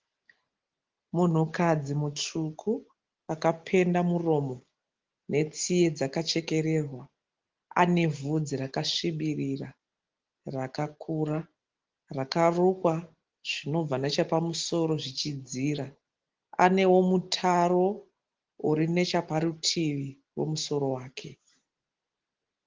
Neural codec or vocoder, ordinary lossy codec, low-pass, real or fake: none; Opus, 16 kbps; 7.2 kHz; real